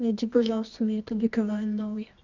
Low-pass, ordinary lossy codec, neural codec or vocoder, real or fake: 7.2 kHz; none; codec, 24 kHz, 0.9 kbps, WavTokenizer, medium music audio release; fake